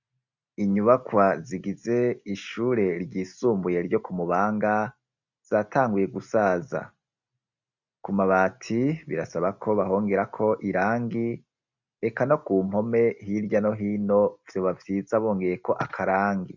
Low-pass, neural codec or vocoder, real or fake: 7.2 kHz; none; real